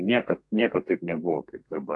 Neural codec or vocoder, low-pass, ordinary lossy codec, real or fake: codec, 32 kHz, 1.9 kbps, SNAC; 10.8 kHz; AAC, 48 kbps; fake